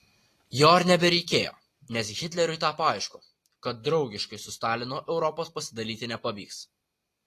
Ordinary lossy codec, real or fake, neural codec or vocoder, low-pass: AAC, 48 kbps; real; none; 14.4 kHz